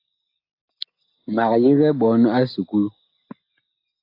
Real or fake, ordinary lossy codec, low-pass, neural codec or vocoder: real; AAC, 32 kbps; 5.4 kHz; none